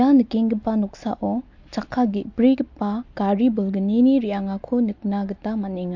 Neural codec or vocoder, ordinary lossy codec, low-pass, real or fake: none; MP3, 48 kbps; 7.2 kHz; real